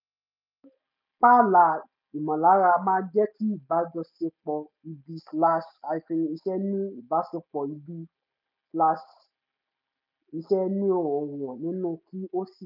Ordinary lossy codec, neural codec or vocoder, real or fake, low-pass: none; none; real; 5.4 kHz